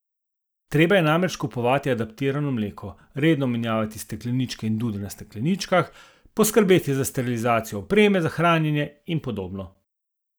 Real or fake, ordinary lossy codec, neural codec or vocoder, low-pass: real; none; none; none